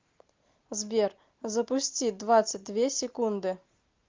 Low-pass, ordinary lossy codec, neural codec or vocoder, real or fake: 7.2 kHz; Opus, 24 kbps; none; real